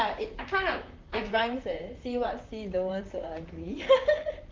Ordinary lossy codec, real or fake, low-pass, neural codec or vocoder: Opus, 32 kbps; fake; 7.2 kHz; vocoder, 44.1 kHz, 128 mel bands, Pupu-Vocoder